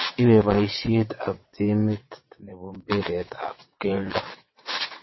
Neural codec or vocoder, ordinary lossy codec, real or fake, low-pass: vocoder, 22.05 kHz, 80 mel bands, WaveNeXt; MP3, 24 kbps; fake; 7.2 kHz